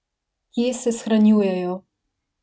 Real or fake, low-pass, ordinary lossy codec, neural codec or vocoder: real; none; none; none